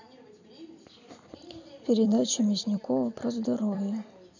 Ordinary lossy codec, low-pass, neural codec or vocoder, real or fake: none; 7.2 kHz; vocoder, 44.1 kHz, 128 mel bands every 256 samples, BigVGAN v2; fake